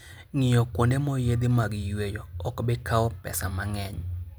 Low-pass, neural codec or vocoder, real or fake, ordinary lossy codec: none; none; real; none